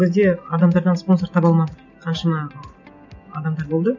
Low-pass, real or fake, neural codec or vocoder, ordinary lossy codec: 7.2 kHz; real; none; none